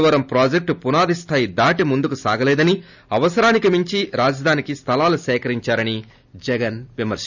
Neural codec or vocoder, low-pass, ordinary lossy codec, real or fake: none; 7.2 kHz; none; real